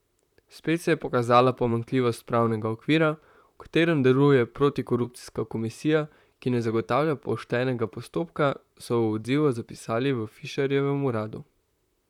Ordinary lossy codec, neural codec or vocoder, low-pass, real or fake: none; vocoder, 44.1 kHz, 128 mel bands, Pupu-Vocoder; 19.8 kHz; fake